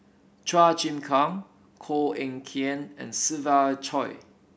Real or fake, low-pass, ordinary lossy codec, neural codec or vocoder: real; none; none; none